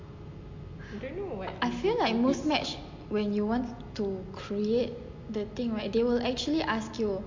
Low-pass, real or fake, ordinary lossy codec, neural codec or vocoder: 7.2 kHz; real; MP3, 48 kbps; none